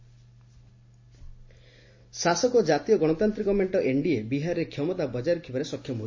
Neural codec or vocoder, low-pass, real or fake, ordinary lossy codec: none; 7.2 kHz; real; MP3, 32 kbps